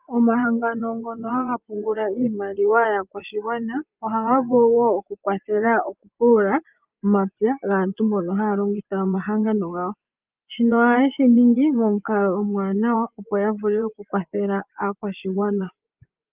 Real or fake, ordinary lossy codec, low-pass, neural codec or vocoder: fake; Opus, 24 kbps; 3.6 kHz; vocoder, 24 kHz, 100 mel bands, Vocos